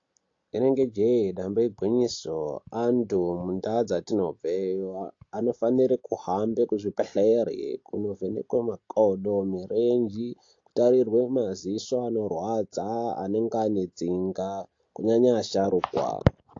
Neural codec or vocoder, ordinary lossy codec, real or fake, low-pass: none; AAC, 48 kbps; real; 7.2 kHz